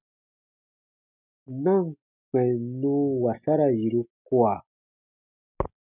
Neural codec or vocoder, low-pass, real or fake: none; 3.6 kHz; real